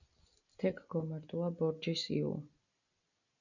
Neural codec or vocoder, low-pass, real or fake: none; 7.2 kHz; real